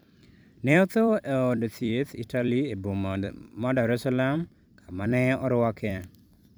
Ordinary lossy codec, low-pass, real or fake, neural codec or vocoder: none; none; fake; vocoder, 44.1 kHz, 128 mel bands every 256 samples, BigVGAN v2